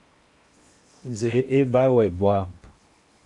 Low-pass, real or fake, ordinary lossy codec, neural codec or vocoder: 10.8 kHz; fake; AAC, 64 kbps; codec, 16 kHz in and 24 kHz out, 0.8 kbps, FocalCodec, streaming, 65536 codes